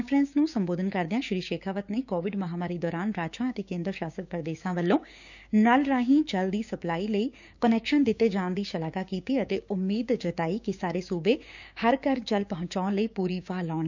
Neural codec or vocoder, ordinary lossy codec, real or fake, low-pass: codec, 16 kHz, 6 kbps, DAC; none; fake; 7.2 kHz